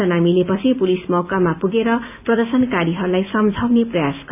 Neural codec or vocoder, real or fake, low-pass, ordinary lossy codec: none; real; 3.6 kHz; none